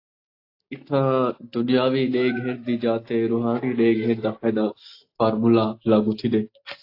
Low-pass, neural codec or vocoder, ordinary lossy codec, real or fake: 5.4 kHz; none; AAC, 32 kbps; real